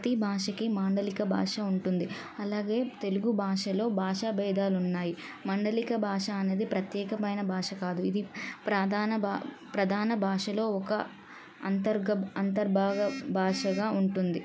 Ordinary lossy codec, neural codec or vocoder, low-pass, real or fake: none; none; none; real